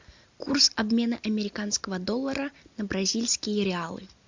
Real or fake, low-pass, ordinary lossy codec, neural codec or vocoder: real; 7.2 kHz; MP3, 64 kbps; none